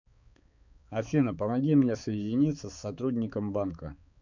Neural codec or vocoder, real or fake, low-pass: codec, 16 kHz, 4 kbps, X-Codec, HuBERT features, trained on balanced general audio; fake; 7.2 kHz